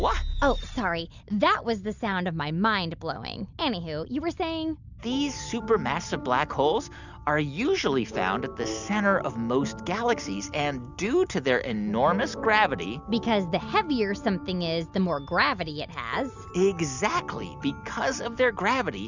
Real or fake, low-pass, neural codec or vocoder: real; 7.2 kHz; none